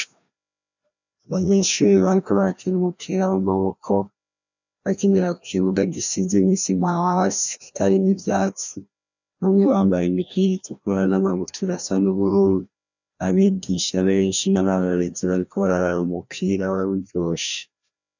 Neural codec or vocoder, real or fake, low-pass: codec, 16 kHz, 1 kbps, FreqCodec, larger model; fake; 7.2 kHz